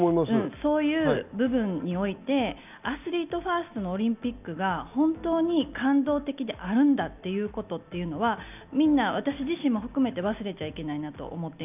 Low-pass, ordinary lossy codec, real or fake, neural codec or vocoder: 3.6 kHz; none; real; none